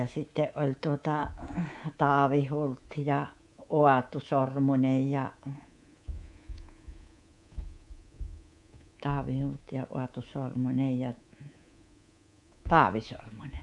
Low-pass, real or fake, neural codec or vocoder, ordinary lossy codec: 10.8 kHz; fake; codec, 24 kHz, 3.1 kbps, DualCodec; none